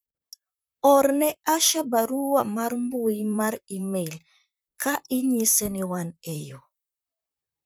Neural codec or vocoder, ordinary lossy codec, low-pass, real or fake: vocoder, 44.1 kHz, 128 mel bands, Pupu-Vocoder; none; none; fake